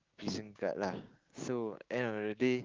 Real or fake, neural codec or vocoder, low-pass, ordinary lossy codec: fake; codec, 16 kHz, 6 kbps, DAC; 7.2 kHz; Opus, 24 kbps